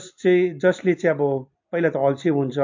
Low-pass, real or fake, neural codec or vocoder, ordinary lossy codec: 7.2 kHz; real; none; MP3, 48 kbps